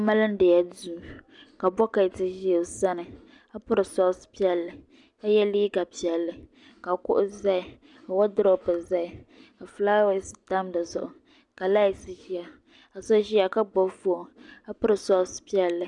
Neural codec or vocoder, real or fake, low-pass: none; real; 10.8 kHz